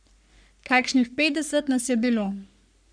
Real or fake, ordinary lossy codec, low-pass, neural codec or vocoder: fake; none; 9.9 kHz; codec, 44.1 kHz, 3.4 kbps, Pupu-Codec